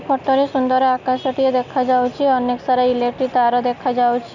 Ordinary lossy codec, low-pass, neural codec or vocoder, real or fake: none; 7.2 kHz; none; real